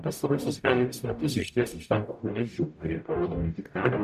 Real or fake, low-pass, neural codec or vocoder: fake; 14.4 kHz; codec, 44.1 kHz, 0.9 kbps, DAC